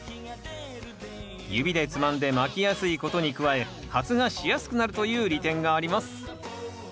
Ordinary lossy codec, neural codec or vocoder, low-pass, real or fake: none; none; none; real